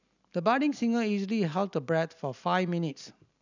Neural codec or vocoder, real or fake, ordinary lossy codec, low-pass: none; real; none; 7.2 kHz